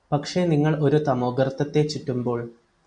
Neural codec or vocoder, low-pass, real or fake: none; 9.9 kHz; real